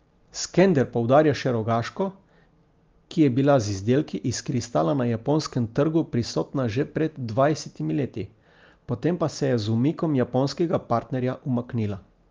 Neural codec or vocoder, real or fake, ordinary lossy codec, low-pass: none; real; Opus, 32 kbps; 7.2 kHz